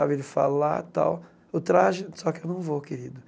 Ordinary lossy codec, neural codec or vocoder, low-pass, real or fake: none; none; none; real